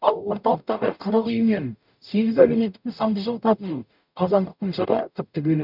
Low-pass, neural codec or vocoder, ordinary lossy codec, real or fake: 5.4 kHz; codec, 44.1 kHz, 0.9 kbps, DAC; none; fake